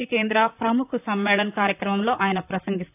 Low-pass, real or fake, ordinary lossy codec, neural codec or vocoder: 3.6 kHz; fake; AAC, 32 kbps; vocoder, 44.1 kHz, 128 mel bands, Pupu-Vocoder